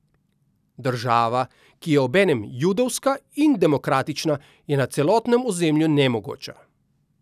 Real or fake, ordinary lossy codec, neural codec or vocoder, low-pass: real; none; none; 14.4 kHz